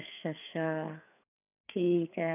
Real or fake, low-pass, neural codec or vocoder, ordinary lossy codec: fake; 3.6 kHz; codec, 16 kHz, 4 kbps, FreqCodec, larger model; none